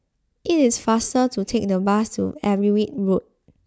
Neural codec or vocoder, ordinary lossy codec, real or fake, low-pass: none; none; real; none